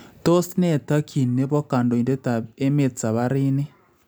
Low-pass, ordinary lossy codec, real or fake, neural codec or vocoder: none; none; real; none